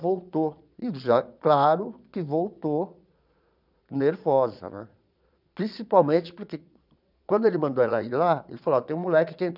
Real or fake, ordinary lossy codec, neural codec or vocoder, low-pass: fake; none; vocoder, 22.05 kHz, 80 mel bands, Vocos; 5.4 kHz